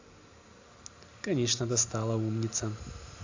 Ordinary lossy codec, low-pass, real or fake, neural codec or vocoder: AAC, 48 kbps; 7.2 kHz; real; none